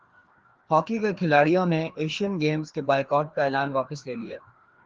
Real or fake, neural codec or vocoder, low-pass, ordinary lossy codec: fake; codec, 16 kHz, 2 kbps, FreqCodec, larger model; 7.2 kHz; Opus, 16 kbps